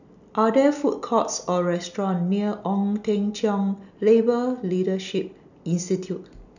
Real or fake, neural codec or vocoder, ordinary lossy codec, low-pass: real; none; none; 7.2 kHz